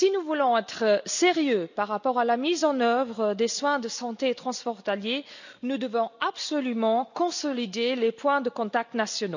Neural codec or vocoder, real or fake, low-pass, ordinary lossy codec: none; real; 7.2 kHz; none